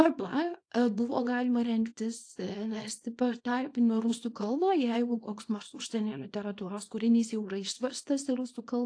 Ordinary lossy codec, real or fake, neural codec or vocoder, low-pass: AAC, 48 kbps; fake; codec, 24 kHz, 0.9 kbps, WavTokenizer, small release; 9.9 kHz